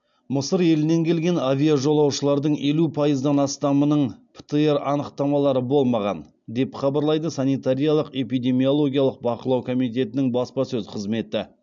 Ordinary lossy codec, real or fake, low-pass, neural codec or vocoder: MP3, 64 kbps; real; 7.2 kHz; none